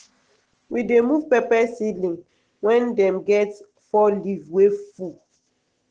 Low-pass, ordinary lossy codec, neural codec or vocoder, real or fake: 9.9 kHz; Opus, 16 kbps; none; real